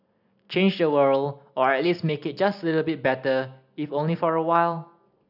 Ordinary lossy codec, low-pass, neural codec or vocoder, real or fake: none; 5.4 kHz; none; real